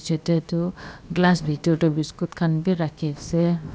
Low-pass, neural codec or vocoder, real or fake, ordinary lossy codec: none; codec, 16 kHz, about 1 kbps, DyCAST, with the encoder's durations; fake; none